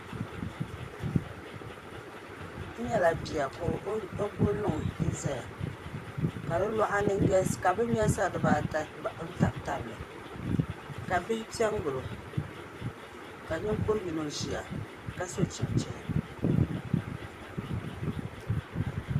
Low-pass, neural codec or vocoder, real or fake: 14.4 kHz; vocoder, 44.1 kHz, 128 mel bands, Pupu-Vocoder; fake